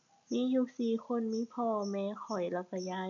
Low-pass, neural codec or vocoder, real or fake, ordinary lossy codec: 7.2 kHz; none; real; none